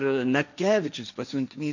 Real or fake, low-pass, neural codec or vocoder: fake; 7.2 kHz; codec, 16 kHz, 1.1 kbps, Voila-Tokenizer